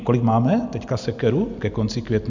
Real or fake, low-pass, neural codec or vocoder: real; 7.2 kHz; none